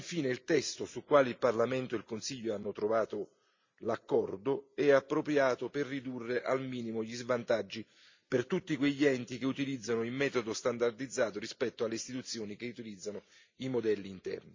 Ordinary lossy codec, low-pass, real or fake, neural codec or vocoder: MP3, 64 kbps; 7.2 kHz; real; none